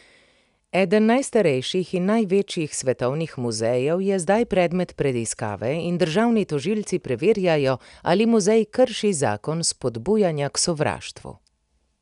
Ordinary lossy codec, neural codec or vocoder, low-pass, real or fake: none; none; 10.8 kHz; real